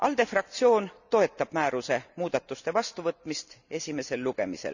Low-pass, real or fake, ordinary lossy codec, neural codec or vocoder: 7.2 kHz; real; none; none